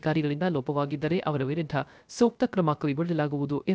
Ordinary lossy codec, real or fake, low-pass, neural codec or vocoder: none; fake; none; codec, 16 kHz, 0.3 kbps, FocalCodec